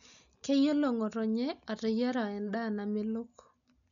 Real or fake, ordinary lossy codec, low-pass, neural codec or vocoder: real; none; 7.2 kHz; none